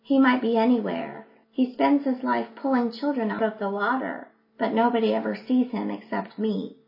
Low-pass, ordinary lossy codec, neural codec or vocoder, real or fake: 5.4 kHz; MP3, 24 kbps; none; real